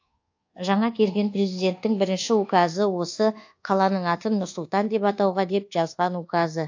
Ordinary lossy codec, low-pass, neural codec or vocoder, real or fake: AAC, 48 kbps; 7.2 kHz; codec, 24 kHz, 1.2 kbps, DualCodec; fake